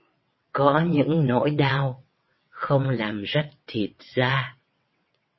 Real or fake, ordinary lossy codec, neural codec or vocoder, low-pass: fake; MP3, 24 kbps; vocoder, 22.05 kHz, 80 mel bands, WaveNeXt; 7.2 kHz